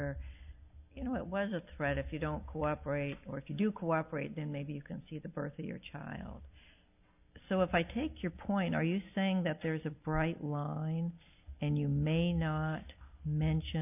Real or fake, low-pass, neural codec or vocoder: real; 3.6 kHz; none